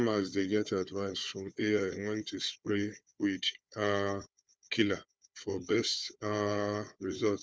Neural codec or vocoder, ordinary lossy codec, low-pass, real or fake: codec, 16 kHz, 4 kbps, FunCodec, trained on Chinese and English, 50 frames a second; none; none; fake